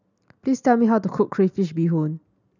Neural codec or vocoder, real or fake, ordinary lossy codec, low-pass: none; real; none; 7.2 kHz